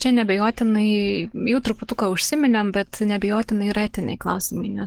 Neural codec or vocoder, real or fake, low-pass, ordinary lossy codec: codec, 44.1 kHz, 7.8 kbps, DAC; fake; 19.8 kHz; Opus, 16 kbps